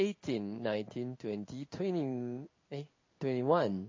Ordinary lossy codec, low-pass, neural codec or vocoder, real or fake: MP3, 32 kbps; 7.2 kHz; codec, 16 kHz in and 24 kHz out, 1 kbps, XY-Tokenizer; fake